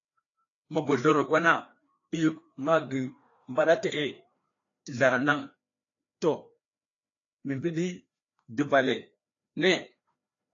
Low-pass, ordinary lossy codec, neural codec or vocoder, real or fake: 7.2 kHz; AAC, 32 kbps; codec, 16 kHz, 2 kbps, FreqCodec, larger model; fake